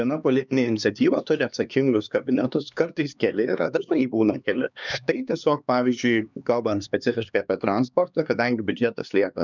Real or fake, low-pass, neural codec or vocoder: fake; 7.2 kHz; codec, 16 kHz, 2 kbps, X-Codec, HuBERT features, trained on LibriSpeech